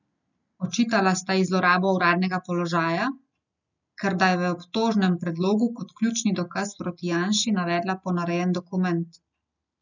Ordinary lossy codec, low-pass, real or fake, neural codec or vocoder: none; 7.2 kHz; real; none